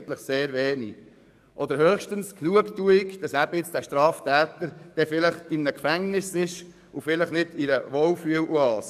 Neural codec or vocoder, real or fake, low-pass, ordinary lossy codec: codec, 44.1 kHz, 7.8 kbps, DAC; fake; 14.4 kHz; none